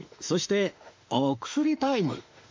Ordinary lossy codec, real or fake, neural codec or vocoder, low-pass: MP3, 48 kbps; fake; autoencoder, 48 kHz, 32 numbers a frame, DAC-VAE, trained on Japanese speech; 7.2 kHz